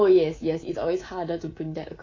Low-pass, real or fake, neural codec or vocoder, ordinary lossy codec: 7.2 kHz; fake; codec, 24 kHz, 3.1 kbps, DualCodec; AAC, 32 kbps